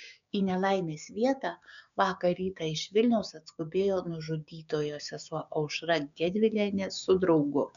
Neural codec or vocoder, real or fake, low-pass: codec, 16 kHz, 6 kbps, DAC; fake; 7.2 kHz